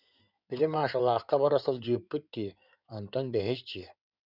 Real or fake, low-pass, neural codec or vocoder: fake; 5.4 kHz; codec, 16 kHz in and 24 kHz out, 2.2 kbps, FireRedTTS-2 codec